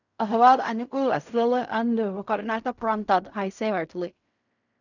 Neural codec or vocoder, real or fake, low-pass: codec, 16 kHz in and 24 kHz out, 0.4 kbps, LongCat-Audio-Codec, fine tuned four codebook decoder; fake; 7.2 kHz